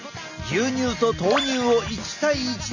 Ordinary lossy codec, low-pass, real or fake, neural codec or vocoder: none; 7.2 kHz; real; none